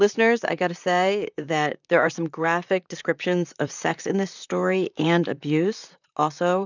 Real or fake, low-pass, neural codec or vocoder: fake; 7.2 kHz; vocoder, 44.1 kHz, 128 mel bands, Pupu-Vocoder